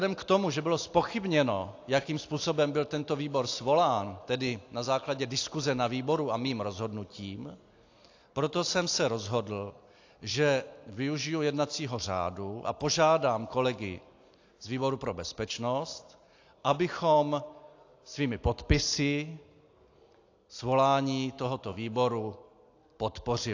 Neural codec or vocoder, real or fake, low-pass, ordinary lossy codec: none; real; 7.2 kHz; AAC, 48 kbps